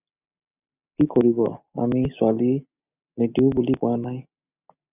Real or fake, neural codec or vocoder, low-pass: real; none; 3.6 kHz